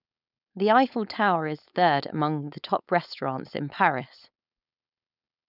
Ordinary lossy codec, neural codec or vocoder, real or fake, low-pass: none; codec, 16 kHz, 4.8 kbps, FACodec; fake; 5.4 kHz